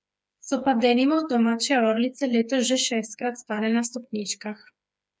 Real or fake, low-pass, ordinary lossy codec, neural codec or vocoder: fake; none; none; codec, 16 kHz, 4 kbps, FreqCodec, smaller model